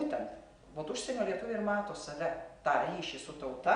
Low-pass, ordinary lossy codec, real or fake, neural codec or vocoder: 9.9 kHz; Opus, 64 kbps; real; none